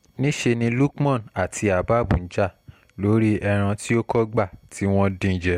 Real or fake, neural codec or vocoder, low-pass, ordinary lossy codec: real; none; 19.8 kHz; MP3, 64 kbps